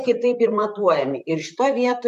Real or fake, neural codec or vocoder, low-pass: fake; vocoder, 44.1 kHz, 128 mel bands, Pupu-Vocoder; 14.4 kHz